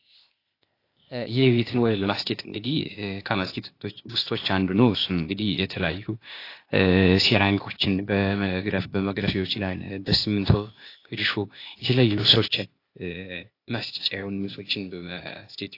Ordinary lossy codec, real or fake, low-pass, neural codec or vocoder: AAC, 32 kbps; fake; 5.4 kHz; codec, 16 kHz, 0.8 kbps, ZipCodec